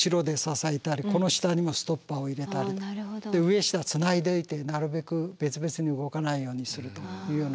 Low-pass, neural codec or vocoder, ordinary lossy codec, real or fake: none; none; none; real